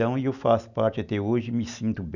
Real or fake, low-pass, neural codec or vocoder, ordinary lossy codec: real; 7.2 kHz; none; none